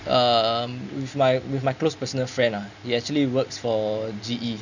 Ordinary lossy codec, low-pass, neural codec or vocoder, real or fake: none; 7.2 kHz; none; real